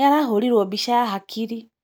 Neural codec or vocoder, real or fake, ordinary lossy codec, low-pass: none; real; none; none